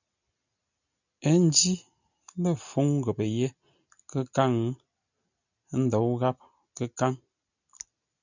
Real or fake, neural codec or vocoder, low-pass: real; none; 7.2 kHz